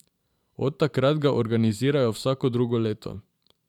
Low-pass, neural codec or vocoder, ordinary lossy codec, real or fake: 19.8 kHz; vocoder, 44.1 kHz, 128 mel bands every 512 samples, BigVGAN v2; none; fake